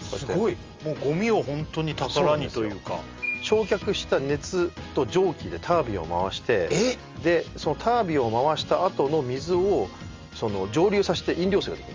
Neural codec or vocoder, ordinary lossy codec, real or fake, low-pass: none; Opus, 32 kbps; real; 7.2 kHz